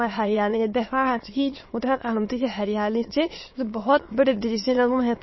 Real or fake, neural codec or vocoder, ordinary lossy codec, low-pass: fake; autoencoder, 22.05 kHz, a latent of 192 numbers a frame, VITS, trained on many speakers; MP3, 24 kbps; 7.2 kHz